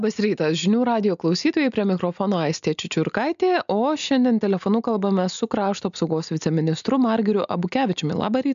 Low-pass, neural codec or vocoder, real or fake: 7.2 kHz; none; real